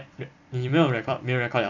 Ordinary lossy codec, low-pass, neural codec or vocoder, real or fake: none; 7.2 kHz; vocoder, 44.1 kHz, 128 mel bands every 256 samples, BigVGAN v2; fake